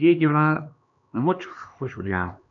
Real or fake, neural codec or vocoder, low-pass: fake; codec, 16 kHz, 2 kbps, X-Codec, HuBERT features, trained on LibriSpeech; 7.2 kHz